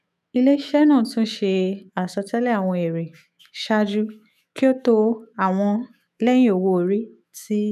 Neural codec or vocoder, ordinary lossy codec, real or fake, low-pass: autoencoder, 48 kHz, 128 numbers a frame, DAC-VAE, trained on Japanese speech; none; fake; 14.4 kHz